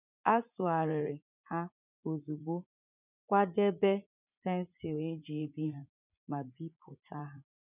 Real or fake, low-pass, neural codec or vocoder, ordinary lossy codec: fake; 3.6 kHz; vocoder, 44.1 kHz, 80 mel bands, Vocos; none